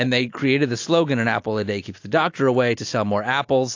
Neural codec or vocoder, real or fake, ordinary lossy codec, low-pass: none; real; AAC, 48 kbps; 7.2 kHz